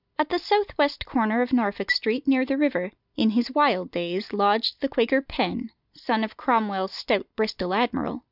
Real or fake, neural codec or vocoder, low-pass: real; none; 5.4 kHz